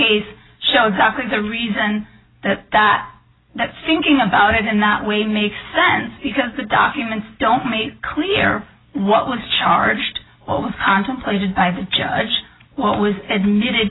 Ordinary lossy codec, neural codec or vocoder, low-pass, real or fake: AAC, 16 kbps; none; 7.2 kHz; real